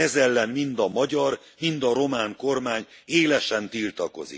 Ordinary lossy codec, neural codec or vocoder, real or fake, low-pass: none; none; real; none